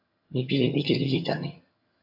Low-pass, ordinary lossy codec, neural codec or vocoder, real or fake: 5.4 kHz; AAC, 48 kbps; vocoder, 22.05 kHz, 80 mel bands, HiFi-GAN; fake